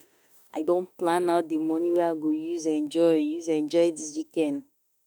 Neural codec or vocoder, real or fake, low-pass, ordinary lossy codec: autoencoder, 48 kHz, 32 numbers a frame, DAC-VAE, trained on Japanese speech; fake; none; none